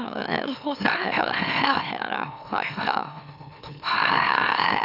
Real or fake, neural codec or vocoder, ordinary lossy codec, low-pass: fake; autoencoder, 44.1 kHz, a latent of 192 numbers a frame, MeloTTS; none; 5.4 kHz